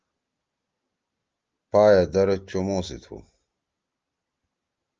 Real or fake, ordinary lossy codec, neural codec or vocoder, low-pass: real; Opus, 24 kbps; none; 7.2 kHz